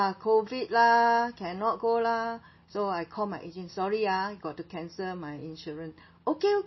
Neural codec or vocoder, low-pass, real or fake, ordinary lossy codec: none; 7.2 kHz; real; MP3, 24 kbps